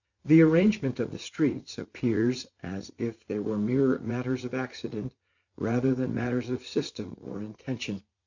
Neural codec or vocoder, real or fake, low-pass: vocoder, 44.1 kHz, 80 mel bands, Vocos; fake; 7.2 kHz